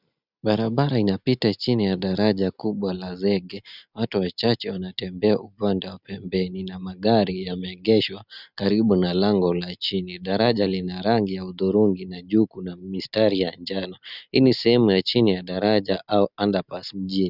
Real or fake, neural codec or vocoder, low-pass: real; none; 5.4 kHz